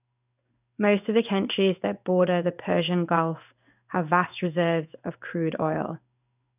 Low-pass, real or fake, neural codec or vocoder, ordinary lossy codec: 3.6 kHz; fake; codec, 16 kHz in and 24 kHz out, 1 kbps, XY-Tokenizer; none